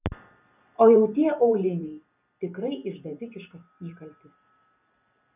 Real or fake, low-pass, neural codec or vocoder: fake; 3.6 kHz; vocoder, 44.1 kHz, 128 mel bands every 512 samples, BigVGAN v2